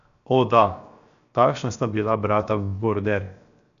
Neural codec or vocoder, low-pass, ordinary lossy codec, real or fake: codec, 16 kHz, 0.7 kbps, FocalCodec; 7.2 kHz; none; fake